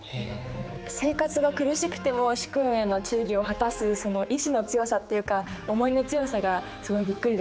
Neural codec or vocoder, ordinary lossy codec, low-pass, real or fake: codec, 16 kHz, 4 kbps, X-Codec, HuBERT features, trained on general audio; none; none; fake